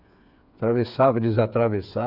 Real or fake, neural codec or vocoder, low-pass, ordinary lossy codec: fake; codec, 16 kHz, 8 kbps, FreqCodec, smaller model; 5.4 kHz; none